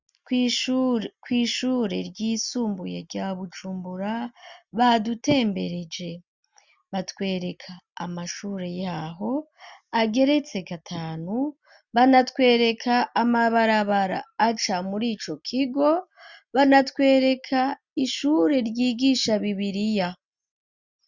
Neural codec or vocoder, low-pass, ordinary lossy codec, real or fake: none; 7.2 kHz; Opus, 64 kbps; real